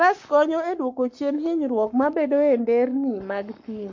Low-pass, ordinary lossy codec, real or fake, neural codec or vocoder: 7.2 kHz; MP3, 48 kbps; fake; codec, 44.1 kHz, 7.8 kbps, Pupu-Codec